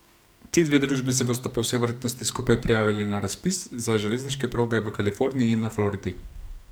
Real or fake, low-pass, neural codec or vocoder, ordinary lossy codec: fake; none; codec, 44.1 kHz, 2.6 kbps, SNAC; none